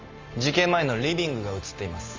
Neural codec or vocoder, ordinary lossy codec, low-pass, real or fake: none; Opus, 32 kbps; 7.2 kHz; real